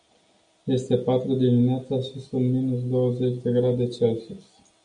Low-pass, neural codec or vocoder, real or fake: 9.9 kHz; none; real